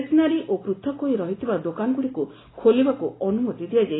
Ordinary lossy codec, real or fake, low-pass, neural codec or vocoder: AAC, 16 kbps; real; 7.2 kHz; none